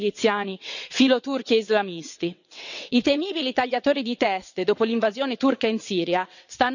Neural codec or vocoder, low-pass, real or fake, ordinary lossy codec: vocoder, 22.05 kHz, 80 mel bands, WaveNeXt; 7.2 kHz; fake; none